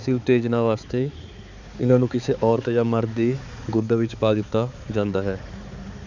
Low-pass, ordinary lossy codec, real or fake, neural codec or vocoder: 7.2 kHz; none; fake; codec, 16 kHz, 4 kbps, X-Codec, HuBERT features, trained on balanced general audio